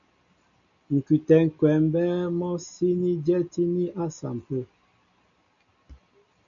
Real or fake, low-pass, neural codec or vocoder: real; 7.2 kHz; none